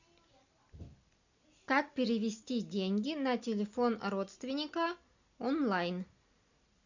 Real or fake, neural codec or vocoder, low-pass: real; none; 7.2 kHz